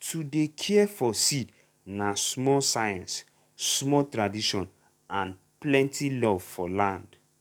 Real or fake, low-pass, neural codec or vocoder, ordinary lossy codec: fake; 19.8 kHz; codec, 44.1 kHz, 7.8 kbps, DAC; none